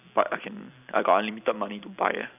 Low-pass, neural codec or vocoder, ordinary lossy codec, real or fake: 3.6 kHz; none; none; real